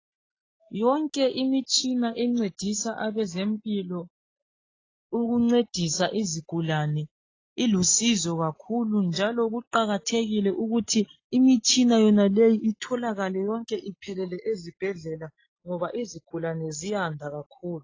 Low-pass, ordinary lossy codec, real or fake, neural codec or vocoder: 7.2 kHz; AAC, 32 kbps; real; none